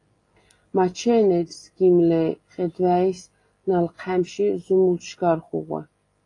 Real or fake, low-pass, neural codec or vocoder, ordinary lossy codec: real; 10.8 kHz; none; AAC, 32 kbps